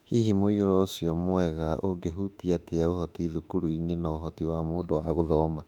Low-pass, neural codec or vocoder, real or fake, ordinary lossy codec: 19.8 kHz; autoencoder, 48 kHz, 32 numbers a frame, DAC-VAE, trained on Japanese speech; fake; none